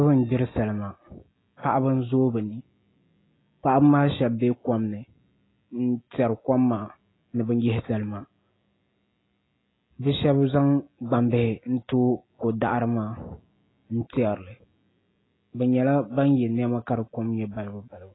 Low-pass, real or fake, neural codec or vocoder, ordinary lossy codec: 7.2 kHz; real; none; AAC, 16 kbps